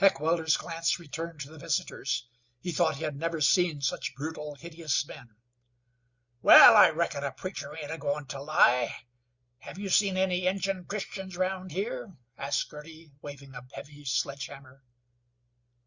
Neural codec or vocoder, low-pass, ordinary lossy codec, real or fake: none; 7.2 kHz; Opus, 64 kbps; real